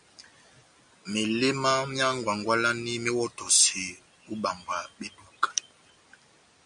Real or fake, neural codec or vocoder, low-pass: real; none; 9.9 kHz